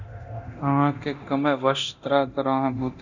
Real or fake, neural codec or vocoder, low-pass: fake; codec, 24 kHz, 0.9 kbps, DualCodec; 7.2 kHz